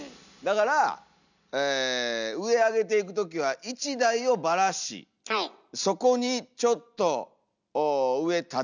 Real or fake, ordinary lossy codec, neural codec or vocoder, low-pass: real; none; none; 7.2 kHz